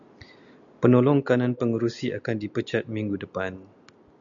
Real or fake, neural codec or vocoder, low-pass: real; none; 7.2 kHz